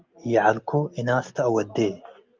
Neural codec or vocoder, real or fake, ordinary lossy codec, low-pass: none; real; Opus, 24 kbps; 7.2 kHz